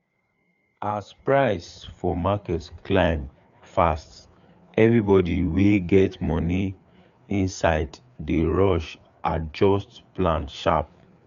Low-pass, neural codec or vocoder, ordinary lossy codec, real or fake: 7.2 kHz; codec, 16 kHz, 4 kbps, FreqCodec, larger model; none; fake